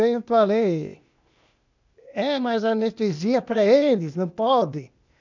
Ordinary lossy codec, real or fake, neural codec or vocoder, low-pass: none; fake; codec, 16 kHz, 0.8 kbps, ZipCodec; 7.2 kHz